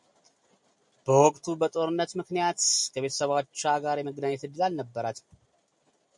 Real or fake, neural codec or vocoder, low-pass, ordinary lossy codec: real; none; 10.8 kHz; MP3, 64 kbps